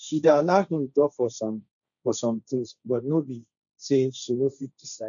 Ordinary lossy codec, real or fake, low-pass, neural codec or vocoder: none; fake; 7.2 kHz; codec, 16 kHz, 1.1 kbps, Voila-Tokenizer